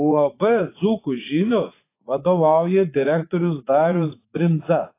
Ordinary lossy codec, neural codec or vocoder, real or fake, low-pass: AAC, 24 kbps; vocoder, 44.1 kHz, 80 mel bands, Vocos; fake; 3.6 kHz